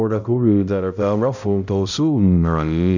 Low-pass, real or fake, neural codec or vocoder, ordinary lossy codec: 7.2 kHz; fake; codec, 16 kHz, 0.5 kbps, X-Codec, HuBERT features, trained on balanced general audio; none